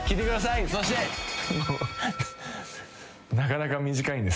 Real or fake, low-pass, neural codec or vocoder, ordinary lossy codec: real; none; none; none